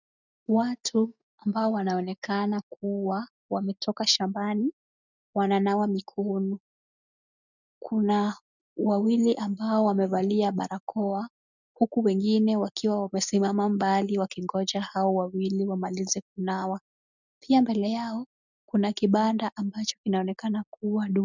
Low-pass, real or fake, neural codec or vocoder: 7.2 kHz; real; none